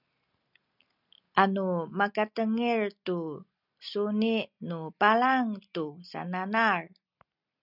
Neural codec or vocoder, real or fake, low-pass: none; real; 5.4 kHz